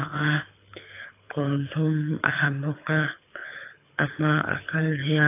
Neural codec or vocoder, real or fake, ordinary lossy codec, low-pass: codec, 24 kHz, 6 kbps, HILCodec; fake; AAC, 24 kbps; 3.6 kHz